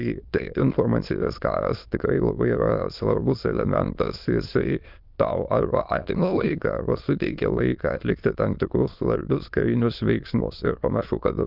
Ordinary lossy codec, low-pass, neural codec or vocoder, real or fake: Opus, 24 kbps; 5.4 kHz; autoencoder, 22.05 kHz, a latent of 192 numbers a frame, VITS, trained on many speakers; fake